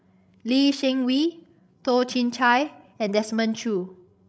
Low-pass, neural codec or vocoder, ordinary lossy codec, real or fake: none; codec, 16 kHz, 16 kbps, FreqCodec, larger model; none; fake